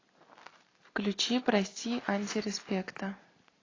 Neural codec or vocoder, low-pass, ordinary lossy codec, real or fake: none; 7.2 kHz; AAC, 32 kbps; real